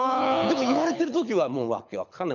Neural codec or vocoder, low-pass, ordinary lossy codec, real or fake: codec, 24 kHz, 6 kbps, HILCodec; 7.2 kHz; none; fake